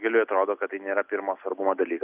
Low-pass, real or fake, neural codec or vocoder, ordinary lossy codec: 3.6 kHz; real; none; Opus, 64 kbps